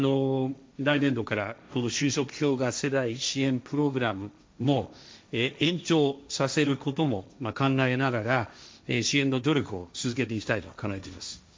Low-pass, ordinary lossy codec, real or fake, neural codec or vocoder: none; none; fake; codec, 16 kHz, 1.1 kbps, Voila-Tokenizer